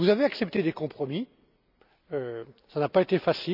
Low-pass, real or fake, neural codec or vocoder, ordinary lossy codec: 5.4 kHz; real; none; none